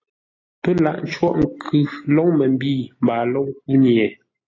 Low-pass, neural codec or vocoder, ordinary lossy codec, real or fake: 7.2 kHz; none; AAC, 32 kbps; real